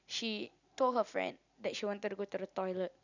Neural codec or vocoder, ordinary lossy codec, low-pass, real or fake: none; none; 7.2 kHz; real